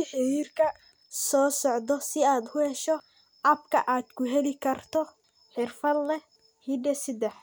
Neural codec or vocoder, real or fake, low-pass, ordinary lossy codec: none; real; none; none